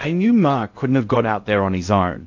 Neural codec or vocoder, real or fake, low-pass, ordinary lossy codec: codec, 16 kHz in and 24 kHz out, 0.6 kbps, FocalCodec, streaming, 2048 codes; fake; 7.2 kHz; AAC, 48 kbps